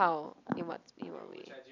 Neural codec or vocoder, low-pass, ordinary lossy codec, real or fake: vocoder, 44.1 kHz, 128 mel bands every 256 samples, BigVGAN v2; 7.2 kHz; none; fake